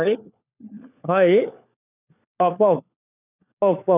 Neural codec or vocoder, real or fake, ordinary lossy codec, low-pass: codec, 16 kHz, 4 kbps, FunCodec, trained on LibriTTS, 50 frames a second; fake; none; 3.6 kHz